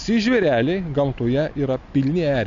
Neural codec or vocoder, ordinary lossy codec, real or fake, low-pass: none; MP3, 64 kbps; real; 7.2 kHz